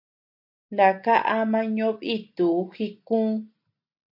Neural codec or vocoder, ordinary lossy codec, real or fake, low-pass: none; AAC, 48 kbps; real; 5.4 kHz